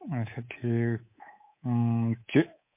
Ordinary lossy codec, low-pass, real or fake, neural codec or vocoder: MP3, 24 kbps; 3.6 kHz; fake; codec, 24 kHz, 3.1 kbps, DualCodec